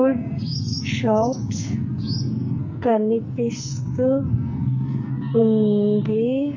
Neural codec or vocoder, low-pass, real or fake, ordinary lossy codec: codec, 44.1 kHz, 2.6 kbps, SNAC; 7.2 kHz; fake; MP3, 32 kbps